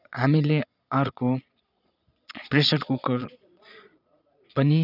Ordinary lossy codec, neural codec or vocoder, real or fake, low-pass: none; vocoder, 22.05 kHz, 80 mel bands, Vocos; fake; 5.4 kHz